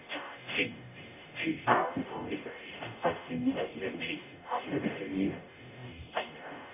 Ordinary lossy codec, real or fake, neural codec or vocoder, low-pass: none; fake; codec, 44.1 kHz, 0.9 kbps, DAC; 3.6 kHz